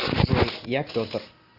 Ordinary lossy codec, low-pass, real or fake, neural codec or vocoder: Opus, 64 kbps; 5.4 kHz; real; none